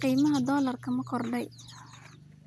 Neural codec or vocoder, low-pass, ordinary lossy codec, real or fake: none; none; none; real